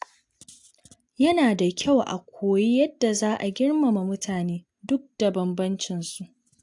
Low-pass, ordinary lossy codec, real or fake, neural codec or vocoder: 10.8 kHz; AAC, 64 kbps; real; none